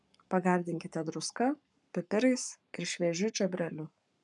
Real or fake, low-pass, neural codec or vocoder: fake; 10.8 kHz; codec, 44.1 kHz, 7.8 kbps, Pupu-Codec